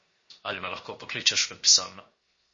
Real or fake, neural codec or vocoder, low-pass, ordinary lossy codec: fake; codec, 16 kHz, 0.7 kbps, FocalCodec; 7.2 kHz; MP3, 32 kbps